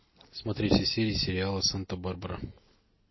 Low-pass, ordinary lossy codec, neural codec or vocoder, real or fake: 7.2 kHz; MP3, 24 kbps; none; real